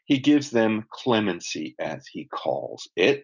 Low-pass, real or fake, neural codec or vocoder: 7.2 kHz; real; none